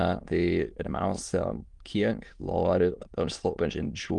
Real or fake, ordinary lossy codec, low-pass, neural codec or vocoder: fake; Opus, 24 kbps; 9.9 kHz; autoencoder, 22.05 kHz, a latent of 192 numbers a frame, VITS, trained on many speakers